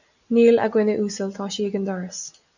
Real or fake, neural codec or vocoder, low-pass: real; none; 7.2 kHz